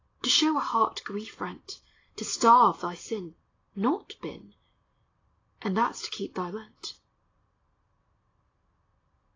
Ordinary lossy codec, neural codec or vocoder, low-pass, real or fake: AAC, 32 kbps; none; 7.2 kHz; real